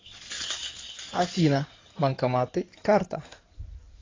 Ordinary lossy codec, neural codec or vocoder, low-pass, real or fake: AAC, 32 kbps; codec, 16 kHz in and 24 kHz out, 2.2 kbps, FireRedTTS-2 codec; 7.2 kHz; fake